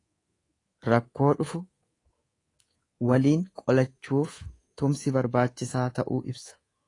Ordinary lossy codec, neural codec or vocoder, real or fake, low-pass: AAC, 32 kbps; codec, 24 kHz, 3.1 kbps, DualCodec; fake; 10.8 kHz